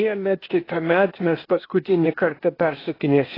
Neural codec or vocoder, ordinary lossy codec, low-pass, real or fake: codec, 16 kHz, 0.8 kbps, ZipCodec; AAC, 24 kbps; 5.4 kHz; fake